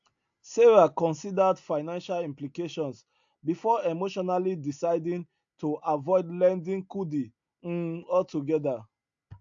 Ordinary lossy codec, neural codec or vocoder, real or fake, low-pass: AAC, 64 kbps; none; real; 7.2 kHz